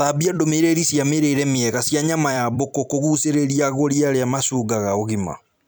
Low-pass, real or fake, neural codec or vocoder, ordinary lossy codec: none; fake; vocoder, 44.1 kHz, 128 mel bands every 256 samples, BigVGAN v2; none